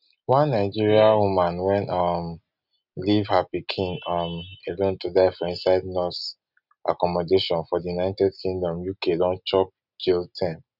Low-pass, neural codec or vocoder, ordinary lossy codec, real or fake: 5.4 kHz; none; none; real